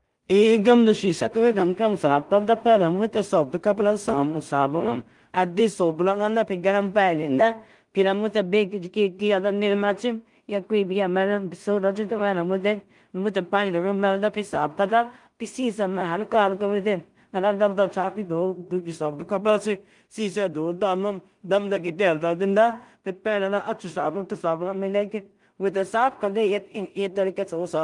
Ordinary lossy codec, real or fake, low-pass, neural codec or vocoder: Opus, 32 kbps; fake; 10.8 kHz; codec, 16 kHz in and 24 kHz out, 0.4 kbps, LongCat-Audio-Codec, two codebook decoder